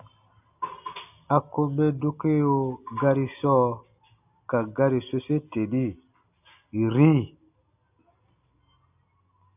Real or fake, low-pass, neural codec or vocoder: real; 3.6 kHz; none